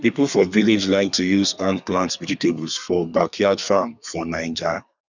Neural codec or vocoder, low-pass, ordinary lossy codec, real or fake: codec, 32 kHz, 1.9 kbps, SNAC; 7.2 kHz; none; fake